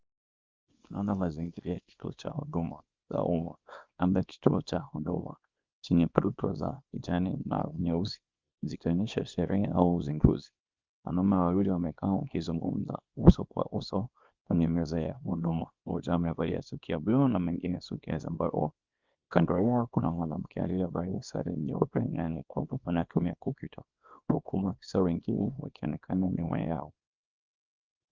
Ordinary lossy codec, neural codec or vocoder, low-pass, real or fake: Opus, 32 kbps; codec, 24 kHz, 0.9 kbps, WavTokenizer, small release; 7.2 kHz; fake